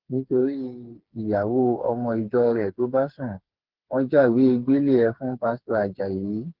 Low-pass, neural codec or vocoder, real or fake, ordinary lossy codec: 5.4 kHz; codec, 16 kHz, 4 kbps, FreqCodec, smaller model; fake; Opus, 16 kbps